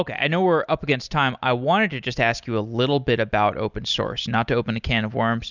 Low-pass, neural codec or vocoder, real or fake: 7.2 kHz; none; real